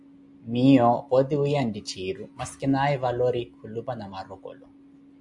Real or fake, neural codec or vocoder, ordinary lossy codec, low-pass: real; none; AAC, 64 kbps; 10.8 kHz